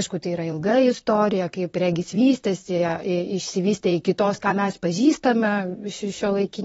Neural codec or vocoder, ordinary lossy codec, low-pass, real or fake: vocoder, 44.1 kHz, 128 mel bands, Pupu-Vocoder; AAC, 24 kbps; 19.8 kHz; fake